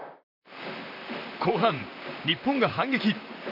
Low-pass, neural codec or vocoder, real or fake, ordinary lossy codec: 5.4 kHz; vocoder, 44.1 kHz, 128 mel bands, Pupu-Vocoder; fake; none